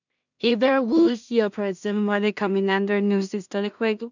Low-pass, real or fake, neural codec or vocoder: 7.2 kHz; fake; codec, 16 kHz in and 24 kHz out, 0.4 kbps, LongCat-Audio-Codec, two codebook decoder